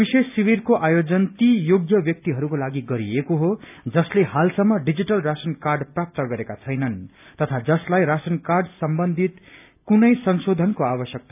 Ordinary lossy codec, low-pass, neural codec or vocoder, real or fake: none; 3.6 kHz; none; real